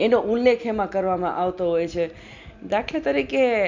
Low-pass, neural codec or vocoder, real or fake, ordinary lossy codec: 7.2 kHz; none; real; MP3, 64 kbps